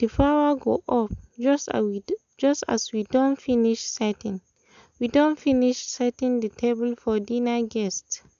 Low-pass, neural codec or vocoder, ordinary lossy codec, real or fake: 7.2 kHz; none; AAC, 96 kbps; real